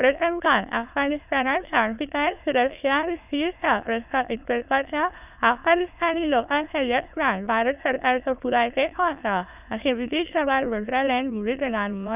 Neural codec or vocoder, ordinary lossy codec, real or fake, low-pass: autoencoder, 22.05 kHz, a latent of 192 numbers a frame, VITS, trained on many speakers; none; fake; 3.6 kHz